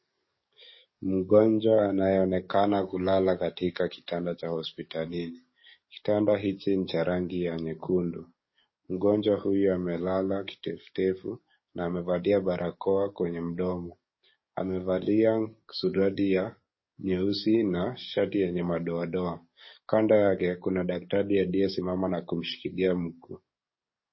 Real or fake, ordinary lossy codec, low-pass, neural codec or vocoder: fake; MP3, 24 kbps; 7.2 kHz; codec, 44.1 kHz, 7.8 kbps, DAC